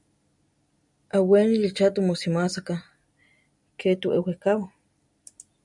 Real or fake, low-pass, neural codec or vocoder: real; 10.8 kHz; none